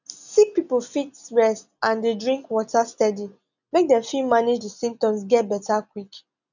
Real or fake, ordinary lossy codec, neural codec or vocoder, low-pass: real; none; none; 7.2 kHz